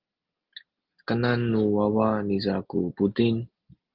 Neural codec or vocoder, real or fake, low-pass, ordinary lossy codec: none; real; 5.4 kHz; Opus, 32 kbps